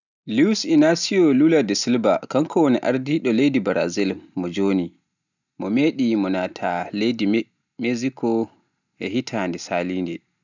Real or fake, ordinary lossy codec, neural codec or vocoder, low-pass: real; none; none; 7.2 kHz